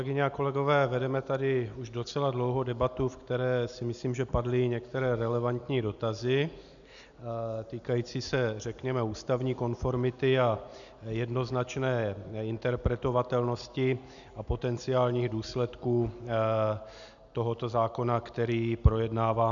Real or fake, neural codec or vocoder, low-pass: real; none; 7.2 kHz